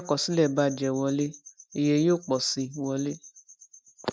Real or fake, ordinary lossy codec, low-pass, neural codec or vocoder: real; none; none; none